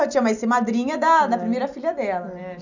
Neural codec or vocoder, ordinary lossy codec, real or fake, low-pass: none; none; real; 7.2 kHz